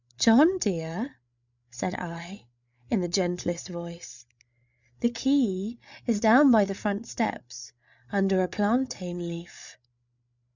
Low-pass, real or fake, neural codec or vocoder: 7.2 kHz; fake; codec, 16 kHz, 8 kbps, FreqCodec, larger model